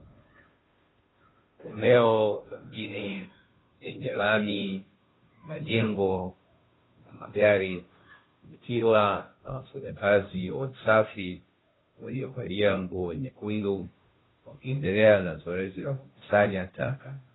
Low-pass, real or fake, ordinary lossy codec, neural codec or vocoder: 7.2 kHz; fake; AAC, 16 kbps; codec, 16 kHz, 0.5 kbps, FunCodec, trained on Chinese and English, 25 frames a second